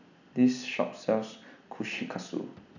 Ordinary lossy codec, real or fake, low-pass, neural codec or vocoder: none; real; 7.2 kHz; none